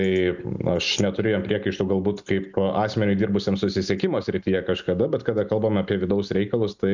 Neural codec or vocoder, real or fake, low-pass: none; real; 7.2 kHz